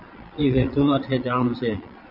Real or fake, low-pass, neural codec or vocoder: fake; 5.4 kHz; vocoder, 22.05 kHz, 80 mel bands, Vocos